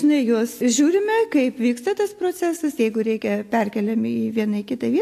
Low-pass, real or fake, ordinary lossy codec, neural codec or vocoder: 14.4 kHz; real; AAC, 64 kbps; none